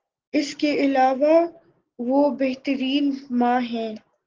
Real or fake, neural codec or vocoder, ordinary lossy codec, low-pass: real; none; Opus, 16 kbps; 7.2 kHz